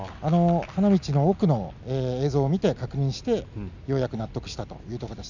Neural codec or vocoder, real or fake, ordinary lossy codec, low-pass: none; real; none; 7.2 kHz